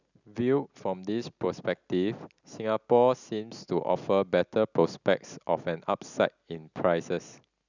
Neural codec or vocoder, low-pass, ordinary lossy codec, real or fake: none; 7.2 kHz; none; real